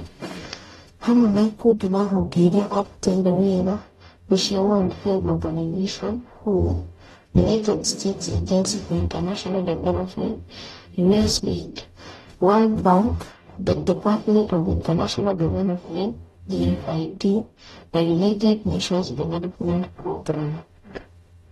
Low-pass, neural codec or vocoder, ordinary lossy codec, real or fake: 19.8 kHz; codec, 44.1 kHz, 0.9 kbps, DAC; AAC, 32 kbps; fake